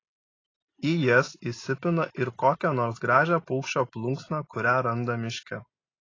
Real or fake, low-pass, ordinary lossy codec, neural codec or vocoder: real; 7.2 kHz; AAC, 32 kbps; none